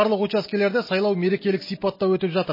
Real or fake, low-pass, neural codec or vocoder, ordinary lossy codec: real; 5.4 kHz; none; MP3, 24 kbps